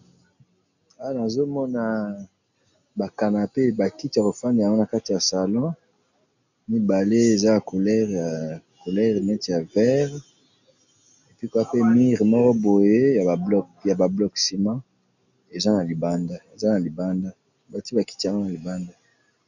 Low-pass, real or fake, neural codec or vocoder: 7.2 kHz; real; none